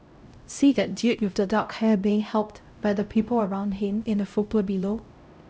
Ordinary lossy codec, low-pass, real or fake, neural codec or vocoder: none; none; fake; codec, 16 kHz, 0.5 kbps, X-Codec, HuBERT features, trained on LibriSpeech